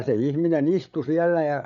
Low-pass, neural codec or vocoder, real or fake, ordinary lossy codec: 7.2 kHz; codec, 16 kHz, 8 kbps, FreqCodec, larger model; fake; none